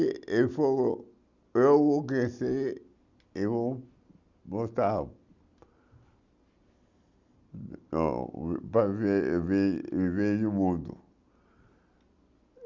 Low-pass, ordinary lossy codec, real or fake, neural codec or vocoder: 7.2 kHz; Opus, 64 kbps; real; none